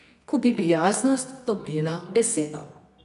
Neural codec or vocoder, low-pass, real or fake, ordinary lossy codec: codec, 24 kHz, 0.9 kbps, WavTokenizer, medium music audio release; 10.8 kHz; fake; none